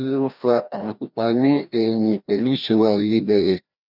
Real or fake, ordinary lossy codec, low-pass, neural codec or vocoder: fake; none; 5.4 kHz; codec, 16 kHz, 1 kbps, FreqCodec, larger model